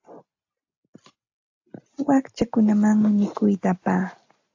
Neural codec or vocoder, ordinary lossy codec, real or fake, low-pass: none; AAC, 48 kbps; real; 7.2 kHz